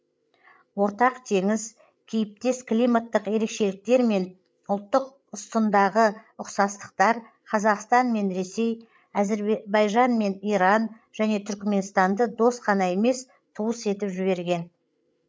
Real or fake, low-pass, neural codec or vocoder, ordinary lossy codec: fake; none; codec, 16 kHz, 8 kbps, FreqCodec, larger model; none